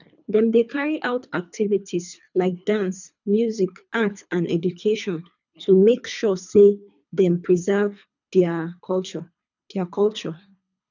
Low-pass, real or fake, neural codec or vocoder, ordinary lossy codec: 7.2 kHz; fake; codec, 24 kHz, 3 kbps, HILCodec; none